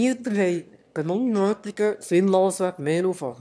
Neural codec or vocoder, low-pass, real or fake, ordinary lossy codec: autoencoder, 22.05 kHz, a latent of 192 numbers a frame, VITS, trained on one speaker; none; fake; none